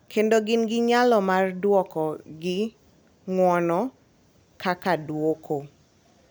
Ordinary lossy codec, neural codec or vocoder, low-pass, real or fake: none; none; none; real